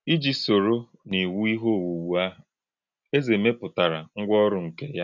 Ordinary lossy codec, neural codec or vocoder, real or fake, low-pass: none; none; real; 7.2 kHz